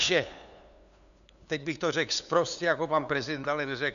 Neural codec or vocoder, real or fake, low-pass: codec, 16 kHz, 2 kbps, FunCodec, trained on LibriTTS, 25 frames a second; fake; 7.2 kHz